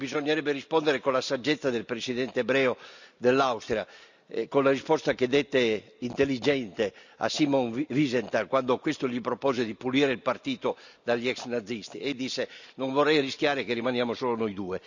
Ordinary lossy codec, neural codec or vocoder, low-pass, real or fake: none; none; 7.2 kHz; real